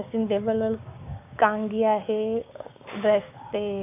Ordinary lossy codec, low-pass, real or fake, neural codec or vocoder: AAC, 32 kbps; 3.6 kHz; fake; codec, 16 kHz, 6 kbps, DAC